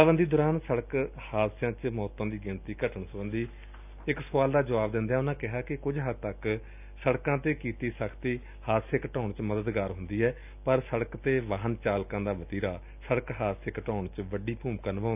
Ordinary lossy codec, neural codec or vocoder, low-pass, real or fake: none; none; 3.6 kHz; real